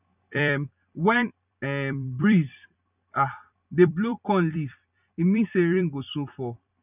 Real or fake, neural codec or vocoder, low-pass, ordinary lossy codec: fake; vocoder, 44.1 kHz, 128 mel bands every 256 samples, BigVGAN v2; 3.6 kHz; none